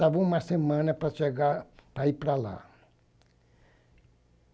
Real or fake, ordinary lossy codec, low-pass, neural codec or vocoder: real; none; none; none